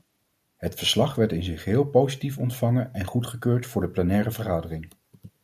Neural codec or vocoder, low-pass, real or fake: none; 14.4 kHz; real